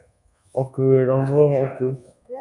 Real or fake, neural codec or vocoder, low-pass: fake; codec, 24 kHz, 1.2 kbps, DualCodec; 10.8 kHz